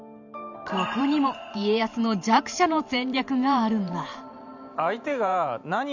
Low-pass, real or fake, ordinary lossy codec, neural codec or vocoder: 7.2 kHz; fake; none; vocoder, 44.1 kHz, 128 mel bands every 512 samples, BigVGAN v2